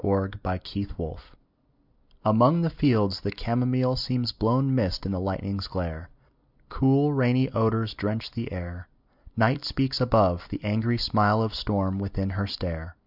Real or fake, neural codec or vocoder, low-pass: real; none; 5.4 kHz